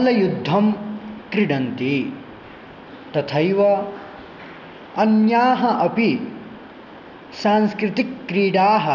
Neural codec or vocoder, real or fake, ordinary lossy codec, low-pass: none; real; none; 7.2 kHz